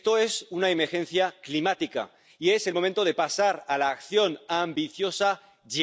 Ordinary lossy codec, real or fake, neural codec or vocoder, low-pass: none; real; none; none